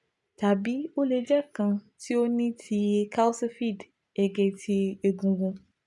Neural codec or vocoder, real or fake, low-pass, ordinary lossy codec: none; real; 10.8 kHz; none